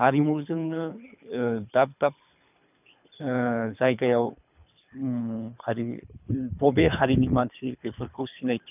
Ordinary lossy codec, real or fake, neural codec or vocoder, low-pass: none; fake; codec, 24 kHz, 3 kbps, HILCodec; 3.6 kHz